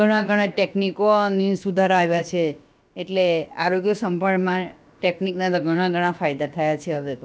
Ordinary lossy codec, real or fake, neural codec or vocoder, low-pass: none; fake; codec, 16 kHz, about 1 kbps, DyCAST, with the encoder's durations; none